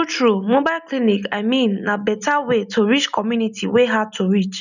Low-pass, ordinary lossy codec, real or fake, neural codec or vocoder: 7.2 kHz; none; real; none